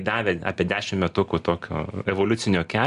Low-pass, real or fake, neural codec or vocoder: 10.8 kHz; real; none